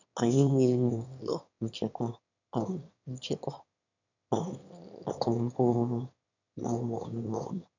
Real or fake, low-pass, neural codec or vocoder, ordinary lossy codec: fake; 7.2 kHz; autoencoder, 22.05 kHz, a latent of 192 numbers a frame, VITS, trained on one speaker; none